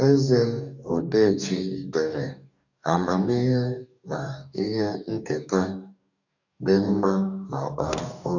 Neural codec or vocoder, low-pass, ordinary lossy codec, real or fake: codec, 44.1 kHz, 2.6 kbps, DAC; 7.2 kHz; none; fake